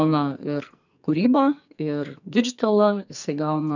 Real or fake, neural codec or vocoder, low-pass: fake; codec, 44.1 kHz, 2.6 kbps, SNAC; 7.2 kHz